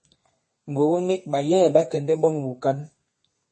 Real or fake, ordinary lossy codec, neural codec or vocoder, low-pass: fake; MP3, 32 kbps; codec, 32 kHz, 1.9 kbps, SNAC; 10.8 kHz